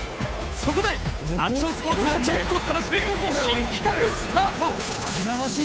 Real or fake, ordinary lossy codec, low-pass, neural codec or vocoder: fake; none; none; codec, 16 kHz, 2 kbps, FunCodec, trained on Chinese and English, 25 frames a second